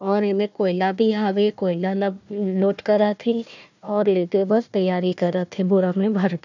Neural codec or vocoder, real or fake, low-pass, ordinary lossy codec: codec, 16 kHz, 1 kbps, FunCodec, trained on Chinese and English, 50 frames a second; fake; 7.2 kHz; none